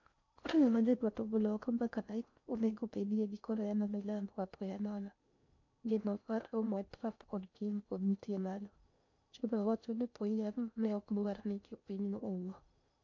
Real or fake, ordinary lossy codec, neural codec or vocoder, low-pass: fake; MP3, 48 kbps; codec, 16 kHz in and 24 kHz out, 0.6 kbps, FocalCodec, streaming, 4096 codes; 7.2 kHz